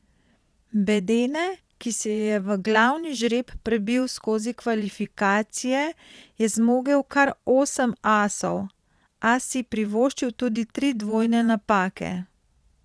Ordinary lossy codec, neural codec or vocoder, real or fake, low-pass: none; vocoder, 22.05 kHz, 80 mel bands, Vocos; fake; none